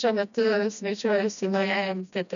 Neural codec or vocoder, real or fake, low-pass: codec, 16 kHz, 1 kbps, FreqCodec, smaller model; fake; 7.2 kHz